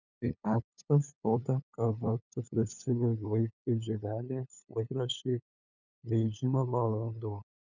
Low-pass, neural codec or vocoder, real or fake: 7.2 kHz; codec, 16 kHz, 8 kbps, FunCodec, trained on LibriTTS, 25 frames a second; fake